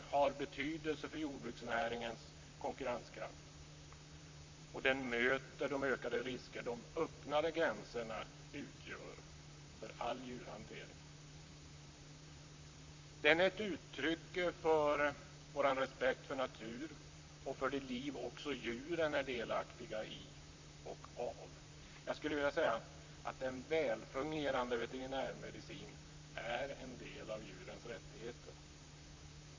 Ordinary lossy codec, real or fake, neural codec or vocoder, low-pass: MP3, 48 kbps; fake; vocoder, 44.1 kHz, 128 mel bands, Pupu-Vocoder; 7.2 kHz